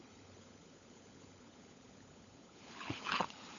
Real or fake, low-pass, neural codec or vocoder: fake; 7.2 kHz; codec, 16 kHz, 16 kbps, FunCodec, trained on LibriTTS, 50 frames a second